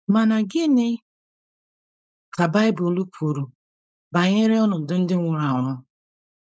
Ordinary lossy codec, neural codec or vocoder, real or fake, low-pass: none; codec, 16 kHz, 4.8 kbps, FACodec; fake; none